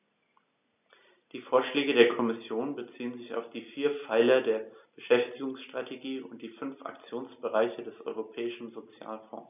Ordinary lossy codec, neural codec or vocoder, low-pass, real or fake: none; none; 3.6 kHz; real